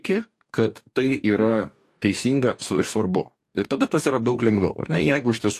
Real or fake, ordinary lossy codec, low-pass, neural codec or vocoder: fake; AAC, 64 kbps; 14.4 kHz; codec, 44.1 kHz, 2.6 kbps, DAC